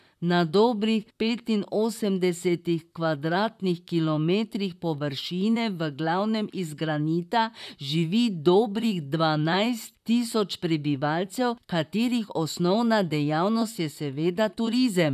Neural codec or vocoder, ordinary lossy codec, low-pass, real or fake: vocoder, 44.1 kHz, 128 mel bands, Pupu-Vocoder; none; 14.4 kHz; fake